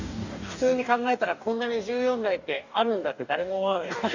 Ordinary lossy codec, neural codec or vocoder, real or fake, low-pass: none; codec, 44.1 kHz, 2.6 kbps, DAC; fake; 7.2 kHz